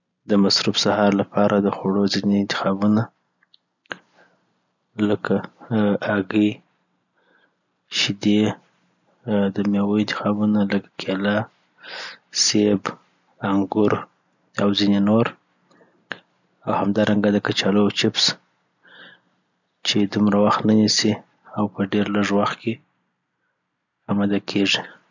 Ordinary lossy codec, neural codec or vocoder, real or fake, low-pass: none; none; real; 7.2 kHz